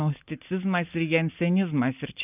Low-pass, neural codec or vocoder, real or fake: 3.6 kHz; none; real